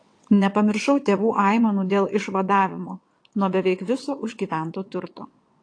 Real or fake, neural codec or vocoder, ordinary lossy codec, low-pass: fake; vocoder, 22.05 kHz, 80 mel bands, WaveNeXt; AAC, 48 kbps; 9.9 kHz